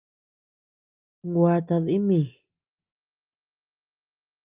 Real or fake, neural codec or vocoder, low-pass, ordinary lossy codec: real; none; 3.6 kHz; Opus, 24 kbps